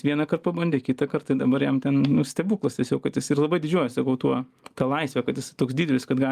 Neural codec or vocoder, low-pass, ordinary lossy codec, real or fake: none; 14.4 kHz; Opus, 32 kbps; real